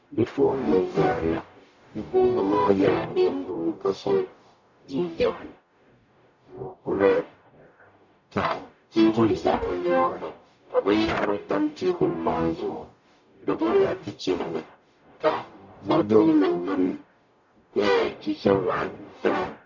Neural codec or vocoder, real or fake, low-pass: codec, 44.1 kHz, 0.9 kbps, DAC; fake; 7.2 kHz